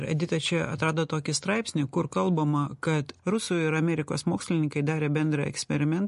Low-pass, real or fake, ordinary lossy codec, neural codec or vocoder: 14.4 kHz; real; MP3, 48 kbps; none